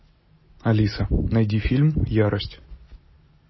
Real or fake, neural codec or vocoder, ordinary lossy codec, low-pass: real; none; MP3, 24 kbps; 7.2 kHz